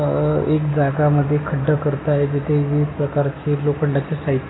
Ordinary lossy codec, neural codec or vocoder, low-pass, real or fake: AAC, 16 kbps; none; 7.2 kHz; real